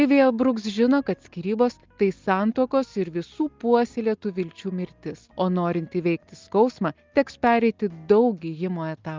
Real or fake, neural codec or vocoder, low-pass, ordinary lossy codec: real; none; 7.2 kHz; Opus, 32 kbps